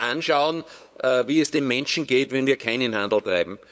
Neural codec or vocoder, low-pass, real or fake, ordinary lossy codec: codec, 16 kHz, 8 kbps, FunCodec, trained on LibriTTS, 25 frames a second; none; fake; none